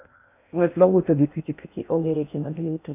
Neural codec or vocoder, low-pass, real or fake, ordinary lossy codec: codec, 16 kHz in and 24 kHz out, 0.6 kbps, FocalCodec, streaming, 4096 codes; 3.6 kHz; fake; none